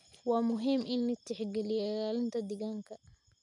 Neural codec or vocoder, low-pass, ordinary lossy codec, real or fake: none; 10.8 kHz; none; real